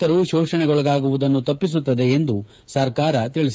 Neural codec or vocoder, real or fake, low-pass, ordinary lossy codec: codec, 16 kHz, 8 kbps, FreqCodec, smaller model; fake; none; none